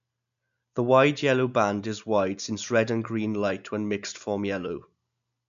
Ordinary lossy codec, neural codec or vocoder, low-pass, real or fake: AAC, 96 kbps; none; 7.2 kHz; real